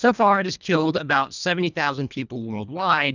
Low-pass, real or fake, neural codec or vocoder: 7.2 kHz; fake; codec, 24 kHz, 1.5 kbps, HILCodec